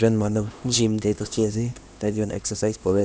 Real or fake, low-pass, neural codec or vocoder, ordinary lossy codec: fake; none; codec, 16 kHz, 1 kbps, X-Codec, HuBERT features, trained on LibriSpeech; none